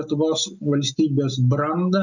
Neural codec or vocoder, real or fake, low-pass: none; real; 7.2 kHz